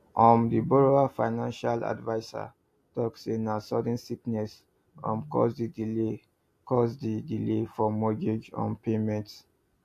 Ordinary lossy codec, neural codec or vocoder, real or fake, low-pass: AAC, 64 kbps; none; real; 14.4 kHz